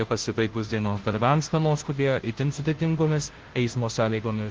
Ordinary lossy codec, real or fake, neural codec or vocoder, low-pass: Opus, 16 kbps; fake; codec, 16 kHz, 0.5 kbps, FunCodec, trained on Chinese and English, 25 frames a second; 7.2 kHz